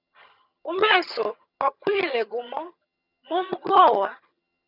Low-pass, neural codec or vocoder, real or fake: 5.4 kHz; vocoder, 22.05 kHz, 80 mel bands, HiFi-GAN; fake